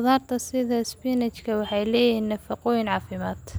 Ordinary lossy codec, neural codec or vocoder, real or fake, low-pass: none; none; real; none